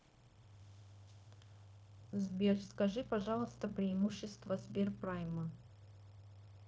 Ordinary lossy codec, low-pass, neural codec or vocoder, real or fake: none; none; codec, 16 kHz, 0.9 kbps, LongCat-Audio-Codec; fake